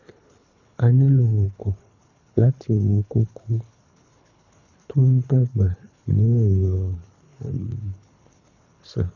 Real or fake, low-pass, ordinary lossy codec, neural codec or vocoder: fake; 7.2 kHz; none; codec, 24 kHz, 3 kbps, HILCodec